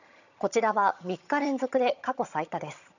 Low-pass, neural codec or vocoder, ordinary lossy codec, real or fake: 7.2 kHz; vocoder, 22.05 kHz, 80 mel bands, HiFi-GAN; none; fake